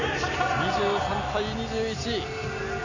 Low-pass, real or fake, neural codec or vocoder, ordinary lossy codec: 7.2 kHz; real; none; none